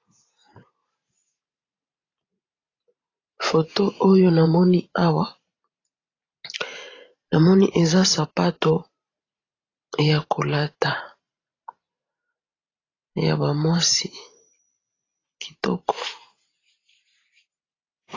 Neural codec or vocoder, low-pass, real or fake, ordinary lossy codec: vocoder, 44.1 kHz, 128 mel bands every 512 samples, BigVGAN v2; 7.2 kHz; fake; AAC, 32 kbps